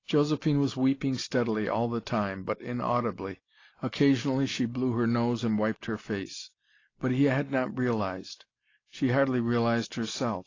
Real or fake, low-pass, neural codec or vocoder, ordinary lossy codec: real; 7.2 kHz; none; AAC, 32 kbps